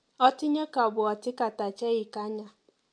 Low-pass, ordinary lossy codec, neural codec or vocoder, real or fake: 9.9 kHz; MP3, 64 kbps; none; real